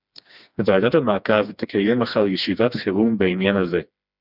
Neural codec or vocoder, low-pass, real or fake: codec, 16 kHz, 2 kbps, FreqCodec, smaller model; 5.4 kHz; fake